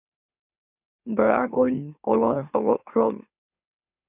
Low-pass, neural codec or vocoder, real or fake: 3.6 kHz; autoencoder, 44.1 kHz, a latent of 192 numbers a frame, MeloTTS; fake